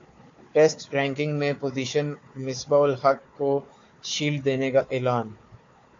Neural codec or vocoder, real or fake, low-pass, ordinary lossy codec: codec, 16 kHz, 4 kbps, FunCodec, trained on Chinese and English, 50 frames a second; fake; 7.2 kHz; AAC, 48 kbps